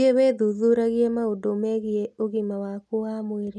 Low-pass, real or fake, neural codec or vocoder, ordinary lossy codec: none; real; none; none